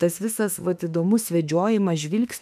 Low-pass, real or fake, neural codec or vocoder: 14.4 kHz; fake; autoencoder, 48 kHz, 32 numbers a frame, DAC-VAE, trained on Japanese speech